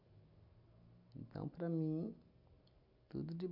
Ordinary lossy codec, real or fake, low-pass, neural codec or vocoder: none; real; 5.4 kHz; none